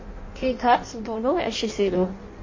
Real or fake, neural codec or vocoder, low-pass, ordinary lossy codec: fake; codec, 16 kHz in and 24 kHz out, 0.6 kbps, FireRedTTS-2 codec; 7.2 kHz; MP3, 32 kbps